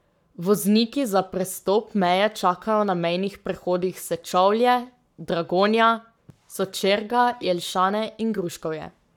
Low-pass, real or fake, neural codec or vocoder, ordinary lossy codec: 19.8 kHz; fake; codec, 44.1 kHz, 7.8 kbps, Pupu-Codec; none